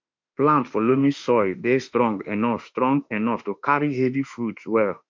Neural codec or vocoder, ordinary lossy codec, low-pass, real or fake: autoencoder, 48 kHz, 32 numbers a frame, DAC-VAE, trained on Japanese speech; MP3, 48 kbps; 7.2 kHz; fake